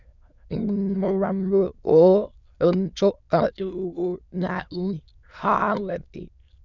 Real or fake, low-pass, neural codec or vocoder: fake; 7.2 kHz; autoencoder, 22.05 kHz, a latent of 192 numbers a frame, VITS, trained on many speakers